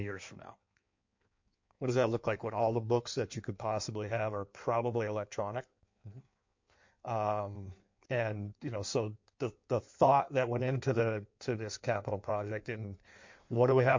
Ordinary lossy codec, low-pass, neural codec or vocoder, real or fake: MP3, 48 kbps; 7.2 kHz; codec, 16 kHz in and 24 kHz out, 1.1 kbps, FireRedTTS-2 codec; fake